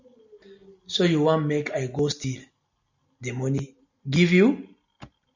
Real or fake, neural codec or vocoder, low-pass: real; none; 7.2 kHz